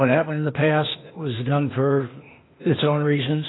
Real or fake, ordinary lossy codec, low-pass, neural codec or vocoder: fake; AAC, 16 kbps; 7.2 kHz; codec, 16 kHz, 0.8 kbps, ZipCodec